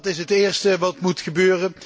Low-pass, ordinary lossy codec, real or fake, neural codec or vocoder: none; none; real; none